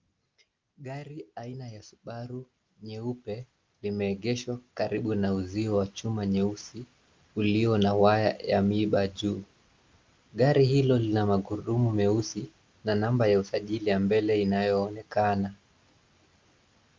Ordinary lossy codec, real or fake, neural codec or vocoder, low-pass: Opus, 24 kbps; real; none; 7.2 kHz